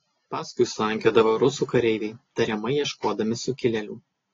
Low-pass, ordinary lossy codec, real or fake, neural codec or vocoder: 7.2 kHz; AAC, 32 kbps; real; none